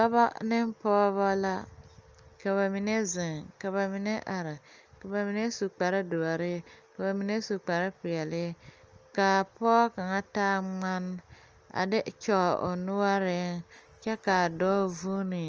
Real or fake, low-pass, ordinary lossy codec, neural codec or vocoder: real; 7.2 kHz; Opus, 24 kbps; none